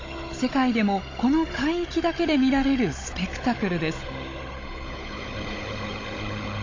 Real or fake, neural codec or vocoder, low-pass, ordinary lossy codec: fake; codec, 16 kHz, 16 kbps, FunCodec, trained on Chinese and English, 50 frames a second; 7.2 kHz; AAC, 32 kbps